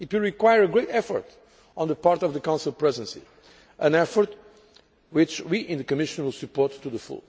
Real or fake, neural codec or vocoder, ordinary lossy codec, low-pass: real; none; none; none